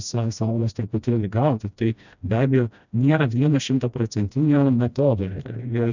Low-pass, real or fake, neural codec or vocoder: 7.2 kHz; fake; codec, 16 kHz, 1 kbps, FreqCodec, smaller model